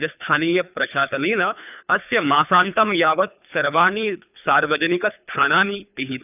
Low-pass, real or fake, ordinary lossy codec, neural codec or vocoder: 3.6 kHz; fake; none; codec, 24 kHz, 3 kbps, HILCodec